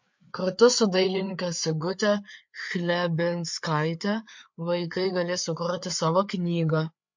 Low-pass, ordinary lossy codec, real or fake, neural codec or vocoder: 7.2 kHz; MP3, 48 kbps; fake; codec, 16 kHz, 4 kbps, FreqCodec, larger model